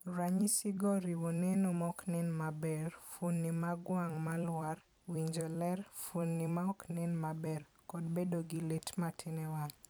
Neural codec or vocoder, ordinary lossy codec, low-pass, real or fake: vocoder, 44.1 kHz, 128 mel bands every 256 samples, BigVGAN v2; none; none; fake